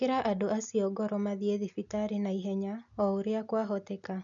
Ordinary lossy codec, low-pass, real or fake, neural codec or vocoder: none; 7.2 kHz; real; none